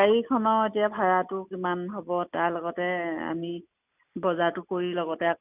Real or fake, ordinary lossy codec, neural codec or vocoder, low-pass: real; none; none; 3.6 kHz